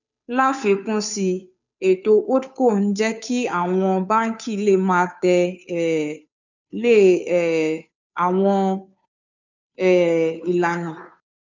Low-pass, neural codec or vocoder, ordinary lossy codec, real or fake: 7.2 kHz; codec, 16 kHz, 2 kbps, FunCodec, trained on Chinese and English, 25 frames a second; none; fake